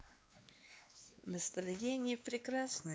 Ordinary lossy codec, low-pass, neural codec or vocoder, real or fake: none; none; codec, 16 kHz, 2 kbps, X-Codec, WavLM features, trained on Multilingual LibriSpeech; fake